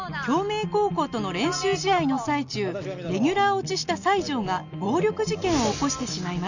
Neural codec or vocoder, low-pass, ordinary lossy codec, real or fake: none; 7.2 kHz; none; real